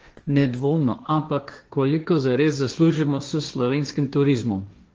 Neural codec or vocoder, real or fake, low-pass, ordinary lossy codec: codec, 16 kHz, 1.1 kbps, Voila-Tokenizer; fake; 7.2 kHz; Opus, 24 kbps